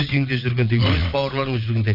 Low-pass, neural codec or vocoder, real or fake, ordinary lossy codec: 5.4 kHz; vocoder, 22.05 kHz, 80 mel bands, WaveNeXt; fake; none